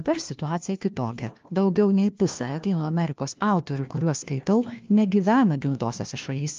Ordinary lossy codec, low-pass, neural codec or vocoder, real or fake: Opus, 32 kbps; 7.2 kHz; codec, 16 kHz, 1 kbps, FunCodec, trained on LibriTTS, 50 frames a second; fake